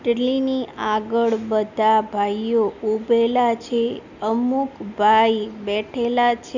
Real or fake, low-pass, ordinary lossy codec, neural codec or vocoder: real; 7.2 kHz; none; none